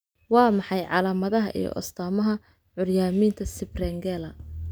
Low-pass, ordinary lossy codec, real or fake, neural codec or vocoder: none; none; real; none